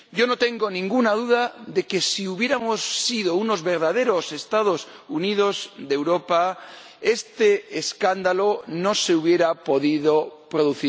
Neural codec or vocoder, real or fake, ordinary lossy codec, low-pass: none; real; none; none